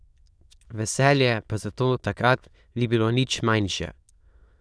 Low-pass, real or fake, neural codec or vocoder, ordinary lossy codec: none; fake; autoencoder, 22.05 kHz, a latent of 192 numbers a frame, VITS, trained on many speakers; none